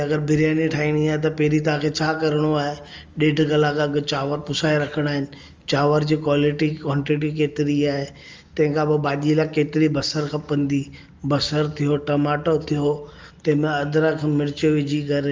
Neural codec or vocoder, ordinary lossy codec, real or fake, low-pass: none; Opus, 32 kbps; real; 7.2 kHz